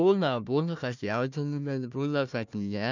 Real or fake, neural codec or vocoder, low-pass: fake; codec, 16 kHz, 1 kbps, FunCodec, trained on Chinese and English, 50 frames a second; 7.2 kHz